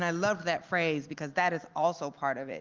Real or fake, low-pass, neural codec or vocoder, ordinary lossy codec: real; 7.2 kHz; none; Opus, 32 kbps